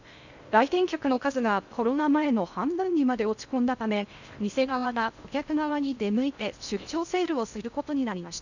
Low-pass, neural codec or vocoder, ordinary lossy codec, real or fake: 7.2 kHz; codec, 16 kHz in and 24 kHz out, 0.6 kbps, FocalCodec, streaming, 2048 codes; none; fake